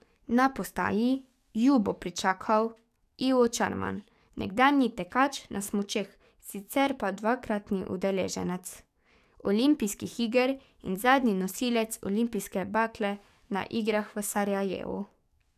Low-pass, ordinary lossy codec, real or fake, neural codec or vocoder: 14.4 kHz; none; fake; codec, 44.1 kHz, 7.8 kbps, DAC